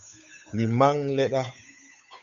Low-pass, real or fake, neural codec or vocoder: 7.2 kHz; fake; codec, 16 kHz, 8 kbps, FunCodec, trained on Chinese and English, 25 frames a second